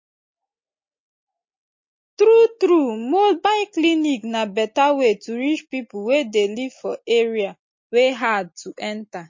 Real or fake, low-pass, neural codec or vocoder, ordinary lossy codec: real; 7.2 kHz; none; MP3, 32 kbps